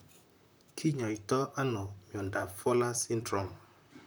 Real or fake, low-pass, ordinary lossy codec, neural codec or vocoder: fake; none; none; vocoder, 44.1 kHz, 128 mel bands, Pupu-Vocoder